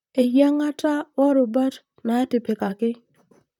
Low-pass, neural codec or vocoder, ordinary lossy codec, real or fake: 19.8 kHz; vocoder, 44.1 kHz, 128 mel bands, Pupu-Vocoder; none; fake